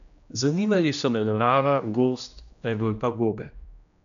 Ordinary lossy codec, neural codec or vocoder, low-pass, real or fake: none; codec, 16 kHz, 1 kbps, X-Codec, HuBERT features, trained on general audio; 7.2 kHz; fake